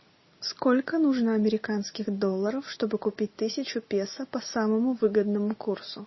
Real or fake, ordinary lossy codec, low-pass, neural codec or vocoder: real; MP3, 24 kbps; 7.2 kHz; none